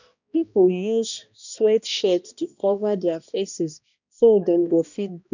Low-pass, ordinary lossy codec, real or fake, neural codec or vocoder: 7.2 kHz; none; fake; codec, 16 kHz, 1 kbps, X-Codec, HuBERT features, trained on balanced general audio